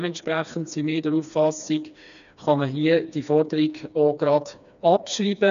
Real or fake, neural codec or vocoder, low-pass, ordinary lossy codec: fake; codec, 16 kHz, 2 kbps, FreqCodec, smaller model; 7.2 kHz; none